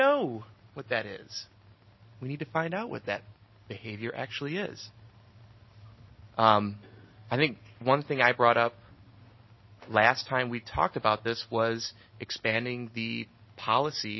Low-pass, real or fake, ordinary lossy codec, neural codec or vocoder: 7.2 kHz; real; MP3, 24 kbps; none